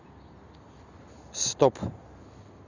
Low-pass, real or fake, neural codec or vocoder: 7.2 kHz; real; none